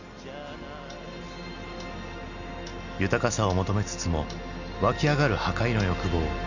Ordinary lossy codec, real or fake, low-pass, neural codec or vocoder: none; real; 7.2 kHz; none